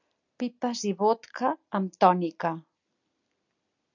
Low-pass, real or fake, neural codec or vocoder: 7.2 kHz; real; none